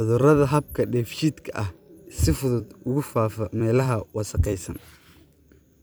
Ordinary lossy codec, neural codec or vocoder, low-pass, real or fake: none; vocoder, 44.1 kHz, 128 mel bands every 256 samples, BigVGAN v2; none; fake